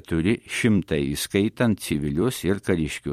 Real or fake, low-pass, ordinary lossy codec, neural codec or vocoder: fake; 19.8 kHz; MP3, 64 kbps; vocoder, 44.1 kHz, 128 mel bands every 256 samples, BigVGAN v2